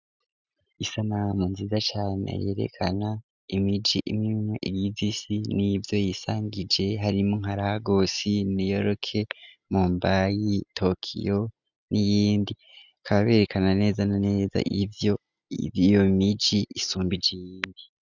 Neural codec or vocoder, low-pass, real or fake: none; 7.2 kHz; real